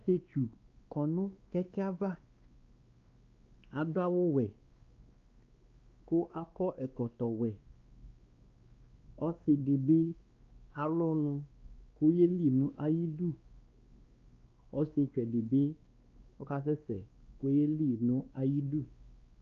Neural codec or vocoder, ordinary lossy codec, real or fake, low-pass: codec, 16 kHz, 2 kbps, X-Codec, WavLM features, trained on Multilingual LibriSpeech; Opus, 24 kbps; fake; 7.2 kHz